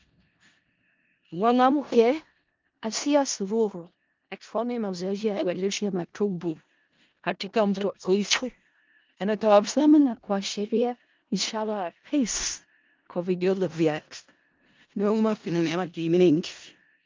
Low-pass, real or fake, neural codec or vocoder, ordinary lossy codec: 7.2 kHz; fake; codec, 16 kHz in and 24 kHz out, 0.4 kbps, LongCat-Audio-Codec, four codebook decoder; Opus, 24 kbps